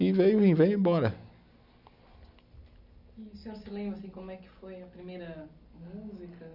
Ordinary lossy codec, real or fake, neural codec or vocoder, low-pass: none; real; none; 5.4 kHz